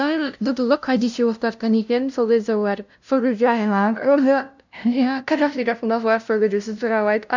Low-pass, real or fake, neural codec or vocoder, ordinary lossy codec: 7.2 kHz; fake; codec, 16 kHz, 0.5 kbps, FunCodec, trained on LibriTTS, 25 frames a second; none